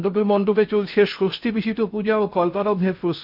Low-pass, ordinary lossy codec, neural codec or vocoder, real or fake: 5.4 kHz; none; codec, 16 kHz in and 24 kHz out, 0.6 kbps, FocalCodec, streaming, 4096 codes; fake